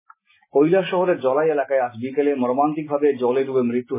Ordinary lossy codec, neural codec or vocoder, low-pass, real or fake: MP3, 16 kbps; none; 3.6 kHz; real